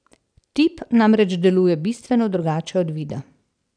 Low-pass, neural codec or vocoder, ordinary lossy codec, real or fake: 9.9 kHz; none; none; real